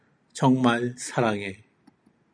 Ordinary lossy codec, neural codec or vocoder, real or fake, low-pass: MP3, 96 kbps; none; real; 9.9 kHz